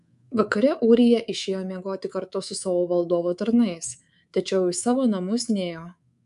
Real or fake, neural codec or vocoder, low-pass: fake; codec, 24 kHz, 3.1 kbps, DualCodec; 10.8 kHz